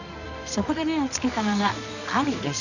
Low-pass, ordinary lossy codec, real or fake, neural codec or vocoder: 7.2 kHz; none; fake; codec, 24 kHz, 0.9 kbps, WavTokenizer, medium music audio release